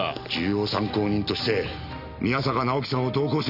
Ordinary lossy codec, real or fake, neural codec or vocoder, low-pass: none; real; none; 5.4 kHz